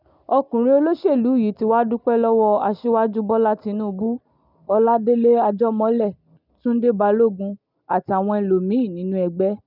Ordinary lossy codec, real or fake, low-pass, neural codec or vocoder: none; real; 5.4 kHz; none